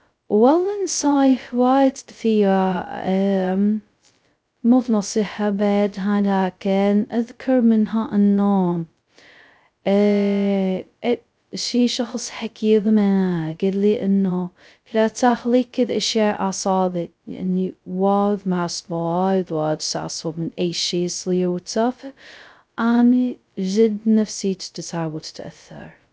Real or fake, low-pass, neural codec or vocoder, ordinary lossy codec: fake; none; codec, 16 kHz, 0.2 kbps, FocalCodec; none